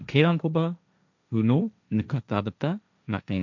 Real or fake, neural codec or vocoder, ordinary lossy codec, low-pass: fake; codec, 16 kHz, 1.1 kbps, Voila-Tokenizer; none; 7.2 kHz